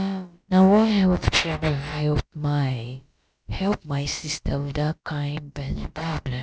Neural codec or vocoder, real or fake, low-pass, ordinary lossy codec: codec, 16 kHz, about 1 kbps, DyCAST, with the encoder's durations; fake; none; none